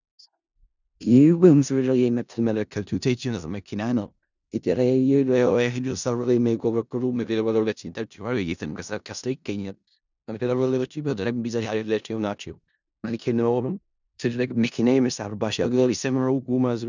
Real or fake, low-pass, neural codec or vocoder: fake; 7.2 kHz; codec, 16 kHz in and 24 kHz out, 0.4 kbps, LongCat-Audio-Codec, four codebook decoder